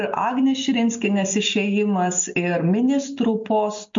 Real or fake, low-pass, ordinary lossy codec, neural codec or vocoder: real; 7.2 kHz; MP3, 48 kbps; none